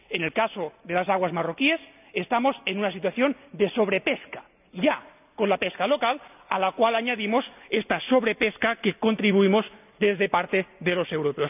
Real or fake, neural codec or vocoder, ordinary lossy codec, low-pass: real; none; none; 3.6 kHz